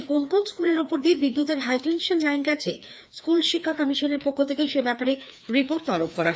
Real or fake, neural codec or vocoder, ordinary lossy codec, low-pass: fake; codec, 16 kHz, 2 kbps, FreqCodec, larger model; none; none